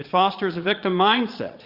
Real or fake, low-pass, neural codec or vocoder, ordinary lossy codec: fake; 5.4 kHz; vocoder, 22.05 kHz, 80 mel bands, Vocos; Opus, 64 kbps